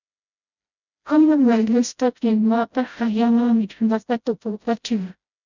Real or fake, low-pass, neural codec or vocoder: fake; 7.2 kHz; codec, 16 kHz, 0.5 kbps, FreqCodec, smaller model